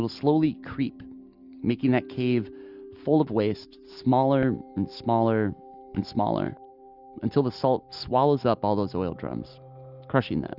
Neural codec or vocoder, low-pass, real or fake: none; 5.4 kHz; real